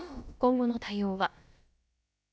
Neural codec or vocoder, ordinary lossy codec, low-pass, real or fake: codec, 16 kHz, about 1 kbps, DyCAST, with the encoder's durations; none; none; fake